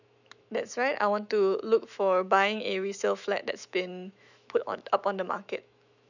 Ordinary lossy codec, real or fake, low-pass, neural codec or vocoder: none; fake; 7.2 kHz; autoencoder, 48 kHz, 128 numbers a frame, DAC-VAE, trained on Japanese speech